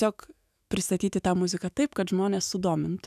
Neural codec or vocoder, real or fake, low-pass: autoencoder, 48 kHz, 128 numbers a frame, DAC-VAE, trained on Japanese speech; fake; 14.4 kHz